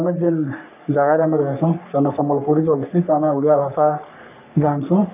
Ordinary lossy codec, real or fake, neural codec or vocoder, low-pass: none; fake; codec, 44.1 kHz, 3.4 kbps, Pupu-Codec; 3.6 kHz